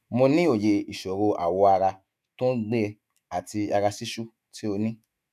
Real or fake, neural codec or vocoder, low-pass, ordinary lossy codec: fake; autoencoder, 48 kHz, 128 numbers a frame, DAC-VAE, trained on Japanese speech; 14.4 kHz; none